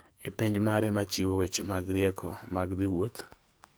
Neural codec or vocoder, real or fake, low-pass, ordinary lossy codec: codec, 44.1 kHz, 2.6 kbps, SNAC; fake; none; none